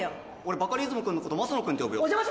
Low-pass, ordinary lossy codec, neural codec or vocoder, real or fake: none; none; none; real